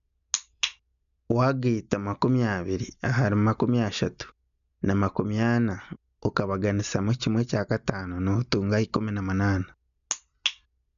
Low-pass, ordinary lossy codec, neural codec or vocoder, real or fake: 7.2 kHz; none; none; real